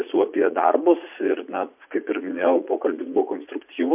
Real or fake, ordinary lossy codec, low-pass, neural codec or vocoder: fake; MP3, 32 kbps; 3.6 kHz; vocoder, 44.1 kHz, 80 mel bands, Vocos